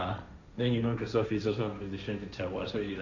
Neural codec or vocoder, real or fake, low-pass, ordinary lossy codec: codec, 16 kHz, 1.1 kbps, Voila-Tokenizer; fake; 7.2 kHz; none